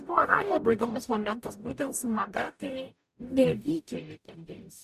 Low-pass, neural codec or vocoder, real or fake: 14.4 kHz; codec, 44.1 kHz, 0.9 kbps, DAC; fake